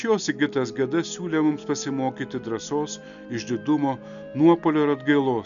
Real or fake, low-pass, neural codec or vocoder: real; 7.2 kHz; none